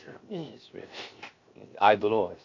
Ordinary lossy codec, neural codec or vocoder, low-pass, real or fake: MP3, 48 kbps; codec, 16 kHz, 0.7 kbps, FocalCodec; 7.2 kHz; fake